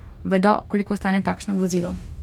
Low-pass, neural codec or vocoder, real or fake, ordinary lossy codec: 19.8 kHz; codec, 44.1 kHz, 2.6 kbps, DAC; fake; none